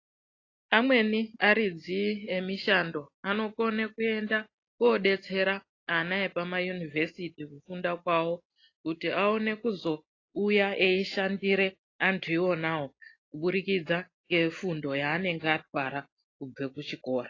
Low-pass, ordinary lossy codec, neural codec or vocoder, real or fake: 7.2 kHz; AAC, 32 kbps; none; real